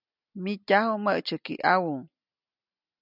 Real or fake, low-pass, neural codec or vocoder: real; 5.4 kHz; none